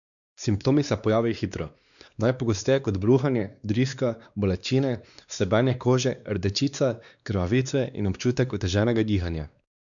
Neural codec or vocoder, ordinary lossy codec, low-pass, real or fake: codec, 16 kHz, 2 kbps, X-Codec, WavLM features, trained on Multilingual LibriSpeech; none; 7.2 kHz; fake